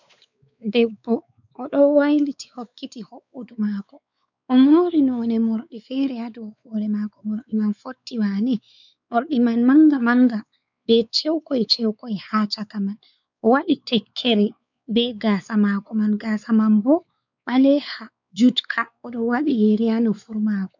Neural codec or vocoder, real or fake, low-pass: codec, 16 kHz, 4 kbps, X-Codec, WavLM features, trained on Multilingual LibriSpeech; fake; 7.2 kHz